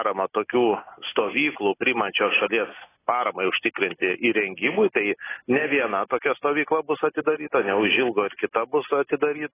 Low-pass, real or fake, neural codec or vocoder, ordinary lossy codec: 3.6 kHz; real; none; AAC, 16 kbps